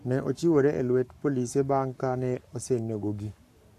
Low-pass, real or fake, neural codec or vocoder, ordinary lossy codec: 14.4 kHz; fake; codec, 44.1 kHz, 7.8 kbps, Pupu-Codec; AAC, 64 kbps